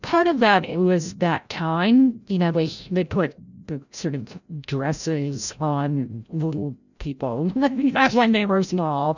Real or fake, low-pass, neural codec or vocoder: fake; 7.2 kHz; codec, 16 kHz, 0.5 kbps, FreqCodec, larger model